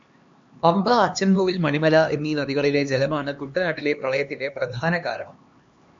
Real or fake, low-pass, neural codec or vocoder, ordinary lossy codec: fake; 7.2 kHz; codec, 16 kHz, 2 kbps, X-Codec, HuBERT features, trained on LibriSpeech; MP3, 48 kbps